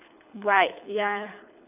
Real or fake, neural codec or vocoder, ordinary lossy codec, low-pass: fake; codec, 24 kHz, 6 kbps, HILCodec; none; 3.6 kHz